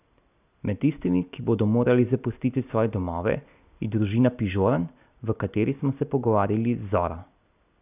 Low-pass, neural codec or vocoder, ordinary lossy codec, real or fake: 3.6 kHz; none; none; real